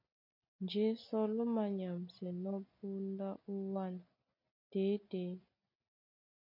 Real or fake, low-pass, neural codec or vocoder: real; 5.4 kHz; none